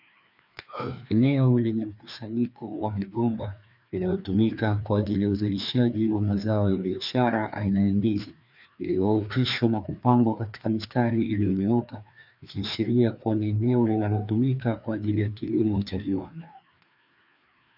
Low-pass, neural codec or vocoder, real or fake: 5.4 kHz; codec, 16 kHz, 2 kbps, FreqCodec, larger model; fake